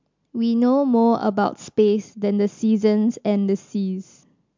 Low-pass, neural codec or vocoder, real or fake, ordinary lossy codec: 7.2 kHz; none; real; MP3, 64 kbps